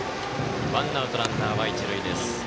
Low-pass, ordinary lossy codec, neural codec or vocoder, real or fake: none; none; none; real